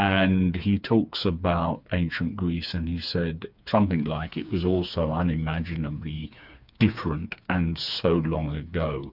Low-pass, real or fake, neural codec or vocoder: 5.4 kHz; fake; codec, 16 kHz, 4 kbps, FreqCodec, smaller model